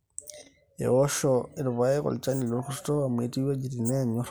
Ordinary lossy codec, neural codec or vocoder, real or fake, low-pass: none; none; real; none